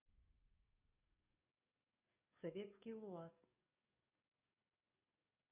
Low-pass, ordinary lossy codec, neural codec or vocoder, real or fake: 3.6 kHz; none; codec, 44.1 kHz, 7.8 kbps, DAC; fake